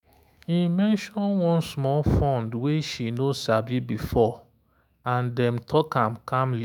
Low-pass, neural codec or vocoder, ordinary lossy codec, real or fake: 19.8 kHz; autoencoder, 48 kHz, 128 numbers a frame, DAC-VAE, trained on Japanese speech; none; fake